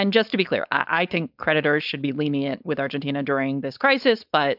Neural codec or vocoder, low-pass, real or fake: codec, 16 kHz, 4.8 kbps, FACodec; 5.4 kHz; fake